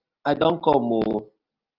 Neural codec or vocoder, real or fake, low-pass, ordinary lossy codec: none; real; 5.4 kHz; Opus, 32 kbps